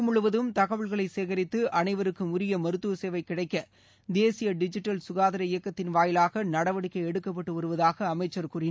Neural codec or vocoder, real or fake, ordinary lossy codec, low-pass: none; real; none; none